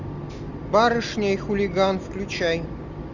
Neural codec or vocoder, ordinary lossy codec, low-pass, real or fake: none; Opus, 64 kbps; 7.2 kHz; real